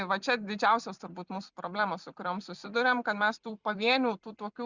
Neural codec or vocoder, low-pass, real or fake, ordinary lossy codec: vocoder, 44.1 kHz, 128 mel bands every 256 samples, BigVGAN v2; 7.2 kHz; fake; Opus, 64 kbps